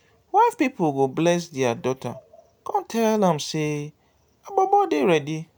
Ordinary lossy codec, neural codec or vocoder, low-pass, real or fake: none; none; none; real